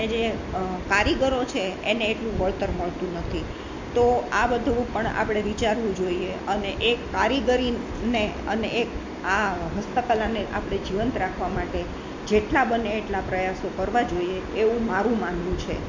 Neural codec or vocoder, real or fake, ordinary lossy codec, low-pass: none; real; MP3, 48 kbps; 7.2 kHz